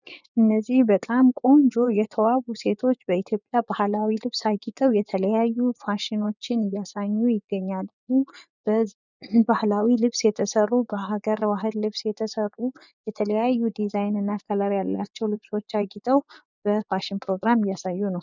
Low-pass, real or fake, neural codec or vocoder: 7.2 kHz; fake; vocoder, 24 kHz, 100 mel bands, Vocos